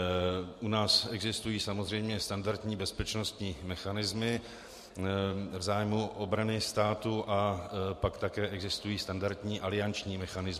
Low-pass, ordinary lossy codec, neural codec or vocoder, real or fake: 14.4 kHz; MP3, 64 kbps; none; real